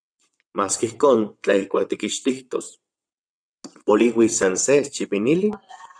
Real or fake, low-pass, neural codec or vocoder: fake; 9.9 kHz; vocoder, 22.05 kHz, 80 mel bands, WaveNeXt